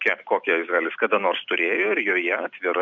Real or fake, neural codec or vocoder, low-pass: real; none; 7.2 kHz